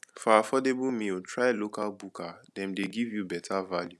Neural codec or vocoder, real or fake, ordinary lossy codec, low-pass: none; real; none; none